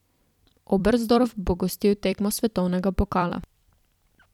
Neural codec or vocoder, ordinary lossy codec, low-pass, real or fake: vocoder, 44.1 kHz, 128 mel bands every 256 samples, BigVGAN v2; none; 19.8 kHz; fake